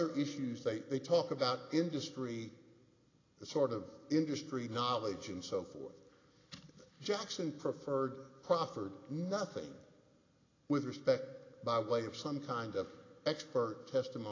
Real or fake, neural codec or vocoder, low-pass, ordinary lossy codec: real; none; 7.2 kHz; AAC, 32 kbps